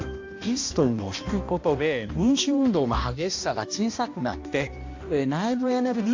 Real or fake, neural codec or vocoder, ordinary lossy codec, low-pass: fake; codec, 16 kHz, 1 kbps, X-Codec, HuBERT features, trained on balanced general audio; AAC, 48 kbps; 7.2 kHz